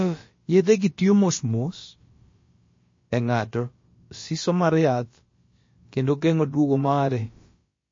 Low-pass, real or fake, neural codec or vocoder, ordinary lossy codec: 7.2 kHz; fake; codec, 16 kHz, about 1 kbps, DyCAST, with the encoder's durations; MP3, 32 kbps